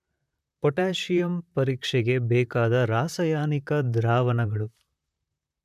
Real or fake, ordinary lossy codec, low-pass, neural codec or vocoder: fake; none; 14.4 kHz; vocoder, 44.1 kHz, 128 mel bands, Pupu-Vocoder